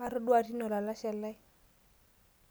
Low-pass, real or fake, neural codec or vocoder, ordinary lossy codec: none; real; none; none